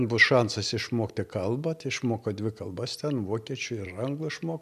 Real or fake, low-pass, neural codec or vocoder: real; 14.4 kHz; none